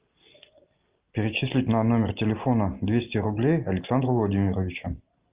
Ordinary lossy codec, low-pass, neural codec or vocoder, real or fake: Opus, 32 kbps; 3.6 kHz; none; real